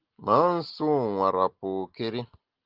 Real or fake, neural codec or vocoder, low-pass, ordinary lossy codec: real; none; 5.4 kHz; Opus, 16 kbps